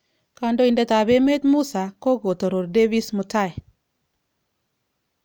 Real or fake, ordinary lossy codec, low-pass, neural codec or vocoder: real; none; none; none